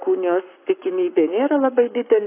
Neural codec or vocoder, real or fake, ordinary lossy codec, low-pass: codec, 44.1 kHz, 7.8 kbps, Pupu-Codec; fake; AAC, 24 kbps; 3.6 kHz